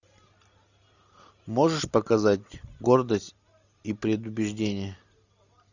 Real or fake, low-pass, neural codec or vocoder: real; 7.2 kHz; none